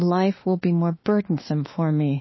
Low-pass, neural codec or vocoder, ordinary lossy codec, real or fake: 7.2 kHz; autoencoder, 48 kHz, 32 numbers a frame, DAC-VAE, trained on Japanese speech; MP3, 24 kbps; fake